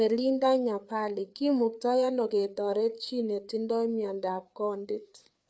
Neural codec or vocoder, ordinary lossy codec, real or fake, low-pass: codec, 16 kHz, 4 kbps, FreqCodec, larger model; none; fake; none